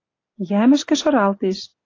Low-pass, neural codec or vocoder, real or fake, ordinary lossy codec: 7.2 kHz; none; real; AAC, 32 kbps